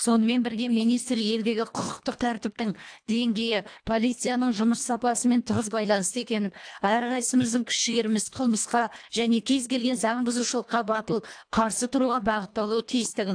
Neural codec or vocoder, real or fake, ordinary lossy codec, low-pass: codec, 24 kHz, 1.5 kbps, HILCodec; fake; AAC, 64 kbps; 9.9 kHz